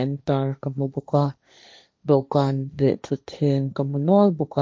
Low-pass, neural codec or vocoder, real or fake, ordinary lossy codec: none; codec, 16 kHz, 1.1 kbps, Voila-Tokenizer; fake; none